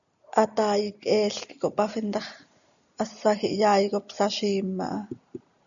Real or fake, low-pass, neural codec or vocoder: real; 7.2 kHz; none